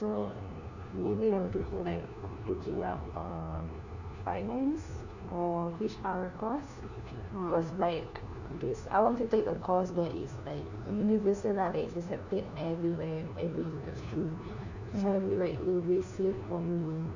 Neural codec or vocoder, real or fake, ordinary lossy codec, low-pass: codec, 16 kHz, 1 kbps, FunCodec, trained on LibriTTS, 50 frames a second; fake; none; 7.2 kHz